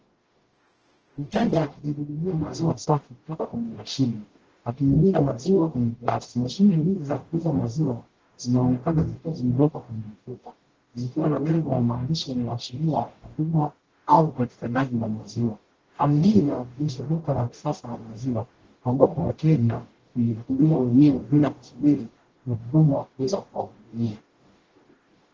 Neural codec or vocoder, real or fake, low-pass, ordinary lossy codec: codec, 44.1 kHz, 0.9 kbps, DAC; fake; 7.2 kHz; Opus, 16 kbps